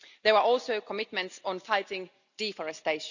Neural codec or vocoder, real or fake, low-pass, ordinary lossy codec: none; real; 7.2 kHz; none